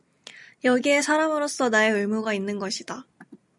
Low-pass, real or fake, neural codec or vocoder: 10.8 kHz; real; none